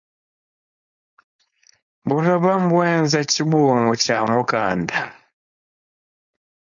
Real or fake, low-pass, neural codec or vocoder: fake; 7.2 kHz; codec, 16 kHz, 4.8 kbps, FACodec